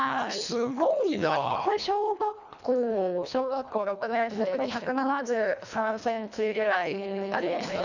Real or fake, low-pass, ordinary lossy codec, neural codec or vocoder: fake; 7.2 kHz; none; codec, 24 kHz, 1.5 kbps, HILCodec